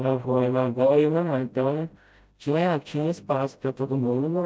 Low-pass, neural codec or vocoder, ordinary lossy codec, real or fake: none; codec, 16 kHz, 0.5 kbps, FreqCodec, smaller model; none; fake